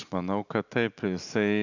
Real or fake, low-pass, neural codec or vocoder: real; 7.2 kHz; none